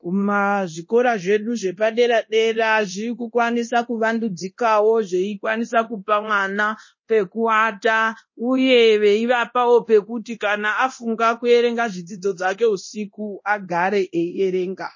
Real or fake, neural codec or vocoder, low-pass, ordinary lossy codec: fake; codec, 24 kHz, 0.9 kbps, DualCodec; 7.2 kHz; MP3, 32 kbps